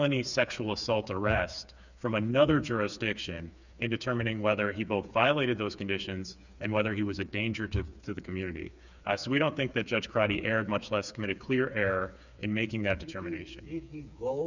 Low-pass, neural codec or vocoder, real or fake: 7.2 kHz; codec, 16 kHz, 4 kbps, FreqCodec, smaller model; fake